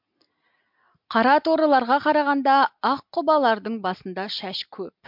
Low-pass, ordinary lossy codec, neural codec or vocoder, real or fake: 5.4 kHz; MP3, 48 kbps; none; real